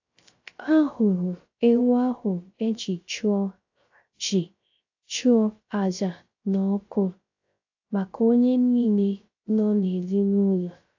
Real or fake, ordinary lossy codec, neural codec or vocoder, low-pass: fake; AAC, 48 kbps; codec, 16 kHz, 0.3 kbps, FocalCodec; 7.2 kHz